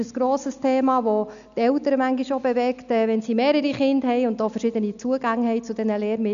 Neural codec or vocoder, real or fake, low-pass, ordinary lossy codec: none; real; 7.2 kHz; none